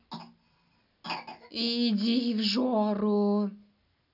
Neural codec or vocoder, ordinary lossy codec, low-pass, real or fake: none; none; 5.4 kHz; real